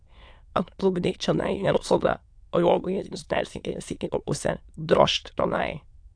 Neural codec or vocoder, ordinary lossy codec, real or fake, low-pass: autoencoder, 22.05 kHz, a latent of 192 numbers a frame, VITS, trained on many speakers; AAC, 64 kbps; fake; 9.9 kHz